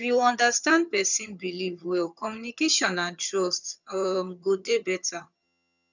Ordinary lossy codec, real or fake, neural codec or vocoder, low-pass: none; fake; vocoder, 22.05 kHz, 80 mel bands, HiFi-GAN; 7.2 kHz